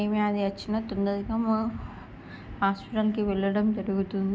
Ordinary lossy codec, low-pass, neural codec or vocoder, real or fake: none; none; none; real